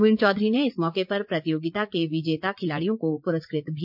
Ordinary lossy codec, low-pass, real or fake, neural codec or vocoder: MP3, 48 kbps; 5.4 kHz; fake; vocoder, 44.1 kHz, 80 mel bands, Vocos